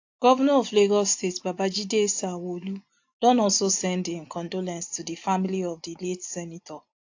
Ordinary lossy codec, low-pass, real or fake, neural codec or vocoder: AAC, 48 kbps; 7.2 kHz; real; none